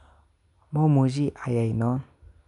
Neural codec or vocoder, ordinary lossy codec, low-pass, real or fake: none; none; 10.8 kHz; real